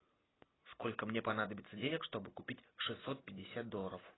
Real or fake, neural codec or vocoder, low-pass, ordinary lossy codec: real; none; 7.2 kHz; AAC, 16 kbps